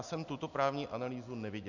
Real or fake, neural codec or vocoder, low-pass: real; none; 7.2 kHz